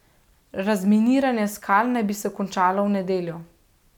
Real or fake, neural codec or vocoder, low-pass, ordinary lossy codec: real; none; 19.8 kHz; none